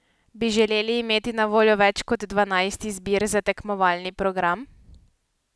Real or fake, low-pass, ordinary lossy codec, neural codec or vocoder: real; none; none; none